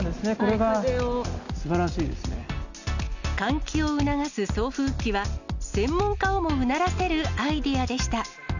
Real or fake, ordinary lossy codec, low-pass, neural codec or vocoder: real; none; 7.2 kHz; none